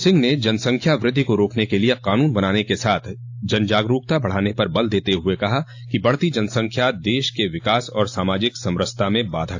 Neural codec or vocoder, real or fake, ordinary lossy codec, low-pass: none; real; AAC, 48 kbps; 7.2 kHz